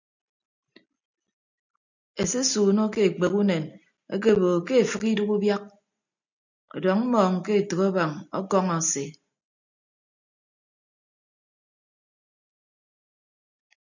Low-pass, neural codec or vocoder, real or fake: 7.2 kHz; none; real